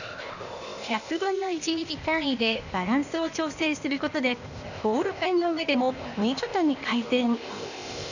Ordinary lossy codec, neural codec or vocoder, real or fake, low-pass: none; codec, 16 kHz, 0.8 kbps, ZipCodec; fake; 7.2 kHz